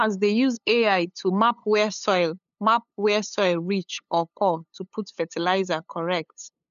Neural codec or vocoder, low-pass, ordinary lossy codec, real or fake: codec, 16 kHz, 8 kbps, FunCodec, trained on LibriTTS, 25 frames a second; 7.2 kHz; none; fake